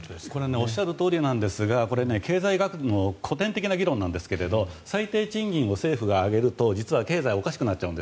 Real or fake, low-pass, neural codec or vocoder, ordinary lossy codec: real; none; none; none